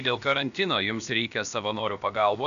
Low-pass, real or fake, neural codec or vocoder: 7.2 kHz; fake; codec, 16 kHz, about 1 kbps, DyCAST, with the encoder's durations